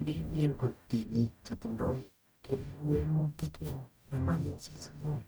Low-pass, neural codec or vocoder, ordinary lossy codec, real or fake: none; codec, 44.1 kHz, 0.9 kbps, DAC; none; fake